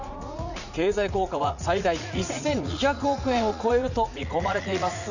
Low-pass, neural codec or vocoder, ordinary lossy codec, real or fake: 7.2 kHz; vocoder, 44.1 kHz, 80 mel bands, Vocos; none; fake